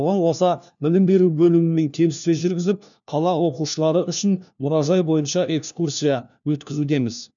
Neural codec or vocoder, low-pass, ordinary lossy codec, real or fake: codec, 16 kHz, 1 kbps, FunCodec, trained on LibriTTS, 50 frames a second; 7.2 kHz; none; fake